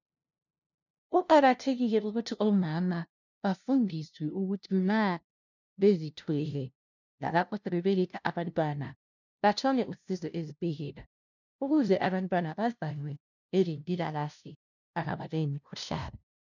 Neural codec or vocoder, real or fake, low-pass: codec, 16 kHz, 0.5 kbps, FunCodec, trained on LibriTTS, 25 frames a second; fake; 7.2 kHz